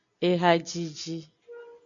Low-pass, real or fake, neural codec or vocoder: 7.2 kHz; real; none